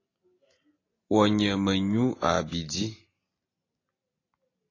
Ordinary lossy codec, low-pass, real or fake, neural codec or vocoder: AAC, 32 kbps; 7.2 kHz; real; none